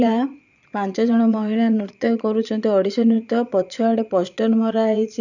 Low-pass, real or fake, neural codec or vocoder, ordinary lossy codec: 7.2 kHz; fake; vocoder, 22.05 kHz, 80 mel bands, Vocos; none